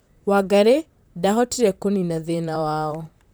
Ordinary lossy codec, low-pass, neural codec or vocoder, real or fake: none; none; vocoder, 44.1 kHz, 128 mel bands, Pupu-Vocoder; fake